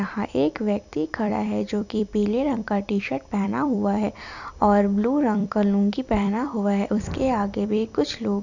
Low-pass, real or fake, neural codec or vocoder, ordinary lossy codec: 7.2 kHz; fake; vocoder, 44.1 kHz, 128 mel bands every 256 samples, BigVGAN v2; none